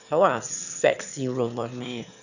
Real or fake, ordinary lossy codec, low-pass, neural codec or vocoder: fake; none; 7.2 kHz; autoencoder, 22.05 kHz, a latent of 192 numbers a frame, VITS, trained on one speaker